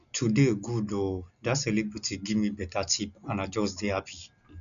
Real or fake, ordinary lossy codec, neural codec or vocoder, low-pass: real; none; none; 7.2 kHz